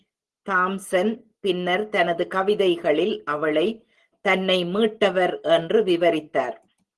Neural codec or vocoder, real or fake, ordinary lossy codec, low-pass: none; real; Opus, 16 kbps; 10.8 kHz